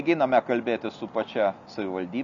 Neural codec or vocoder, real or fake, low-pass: none; real; 7.2 kHz